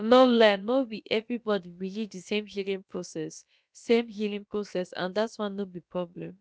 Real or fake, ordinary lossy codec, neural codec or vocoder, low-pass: fake; none; codec, 16 kHz, about 1 kbps, DyCAST, with the encoder's durations; none